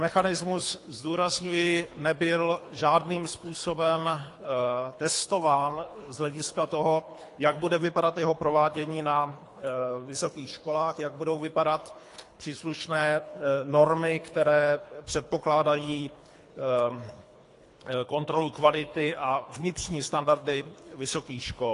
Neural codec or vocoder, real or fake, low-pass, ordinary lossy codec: codec, 24 kHz, 3 kbps, HILCodec; fake; 10.8 kHz; AAC, 48 kbps